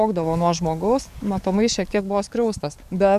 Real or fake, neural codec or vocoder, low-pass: fake; codec, 44.1 kHz, 7.8 kbps, DAC; 14.4 kHz